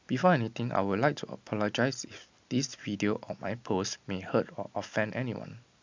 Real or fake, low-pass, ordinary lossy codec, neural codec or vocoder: real; 7.2 kHz; MP3, 64 kbps; none